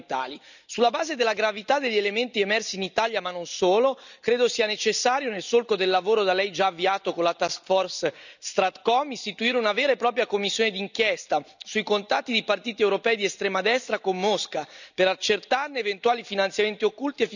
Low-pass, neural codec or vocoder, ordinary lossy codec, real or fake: 7.2 kHz; none; none; real